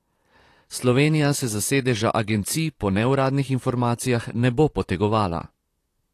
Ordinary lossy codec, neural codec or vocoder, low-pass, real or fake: AAC, 48 kbps; vocoder, 44.1 kHz, 128 mel bands, Pupu-Vocoder; 14.4 kHz; fake